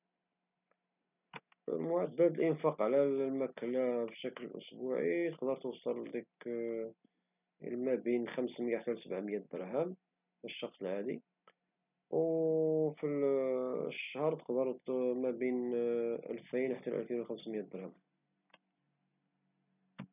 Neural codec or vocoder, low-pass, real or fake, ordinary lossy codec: none; 3.6 kHz; real; none